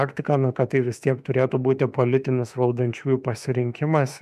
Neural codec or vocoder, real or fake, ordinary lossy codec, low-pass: autoencoder, 48 kHz, 32 numbers a frame, DAC-VAE, trained on Japanese speech; fake; MP3, 96 kbps; 14.4 kHz